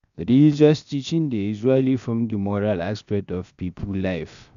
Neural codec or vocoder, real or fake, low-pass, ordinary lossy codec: codec, 16 kHz, 0.7 kbps, FocalCodec; fake; 7.2 kHz; MP3, 96 kbps